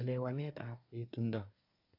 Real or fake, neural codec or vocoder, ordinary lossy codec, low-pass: fake; codec, 24 kHz, 1 kbps, SNAC; AAC, 48 kbps; 5.4 kHz